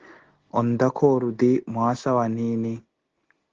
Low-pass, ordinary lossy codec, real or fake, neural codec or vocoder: 7.2 kHz; Opus, 16 kbps; real; none